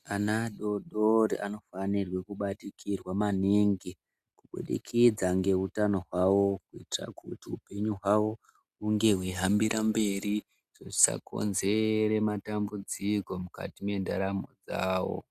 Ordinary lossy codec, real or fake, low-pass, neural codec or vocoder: Opus, 64 kbps; real; 14.4 kHz; none